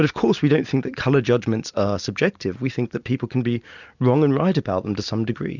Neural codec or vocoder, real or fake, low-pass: none; real; 7.2 kHz